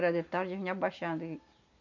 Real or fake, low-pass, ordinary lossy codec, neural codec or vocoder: real; 7.2 kHz; none; none